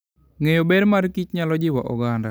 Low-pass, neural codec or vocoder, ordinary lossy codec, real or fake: none; none; none; real